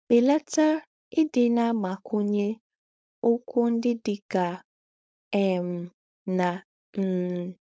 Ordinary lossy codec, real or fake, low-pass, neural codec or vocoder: none; fake; none; codec, 16 kHz, 4.8 kbps, FACodec